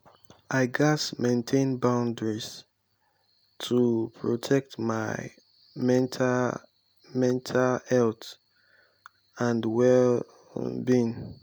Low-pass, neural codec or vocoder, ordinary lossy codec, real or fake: none; none; none; real